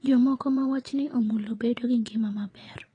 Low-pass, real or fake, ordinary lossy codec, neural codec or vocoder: 9.9 kHz; fake; AAC, 32 kbps; vocoder, 22.05 kHz, 80 mel bands, WaveNeXt